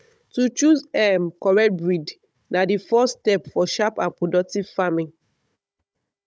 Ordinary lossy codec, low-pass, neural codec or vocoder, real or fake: none; none; codec, 16 kHz, 16 kbps, FunCodec, trained on Chinese and English, 50 frames a second; fake